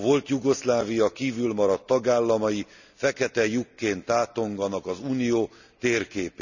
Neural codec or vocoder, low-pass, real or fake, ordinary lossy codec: none; 7.2 kHz; real; none